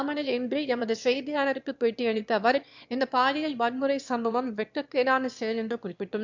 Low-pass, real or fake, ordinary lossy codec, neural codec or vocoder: 7.2 kHz; fake; MP3, 64 kbps; autoencoder, 22.05 kHz, a latent of 192 numbers a frame, VITS, trained on one speaker